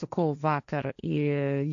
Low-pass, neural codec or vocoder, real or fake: 7.2 kHz; codec, 16 kHz, 1.1 kbps, Voila-Tokenizer; fake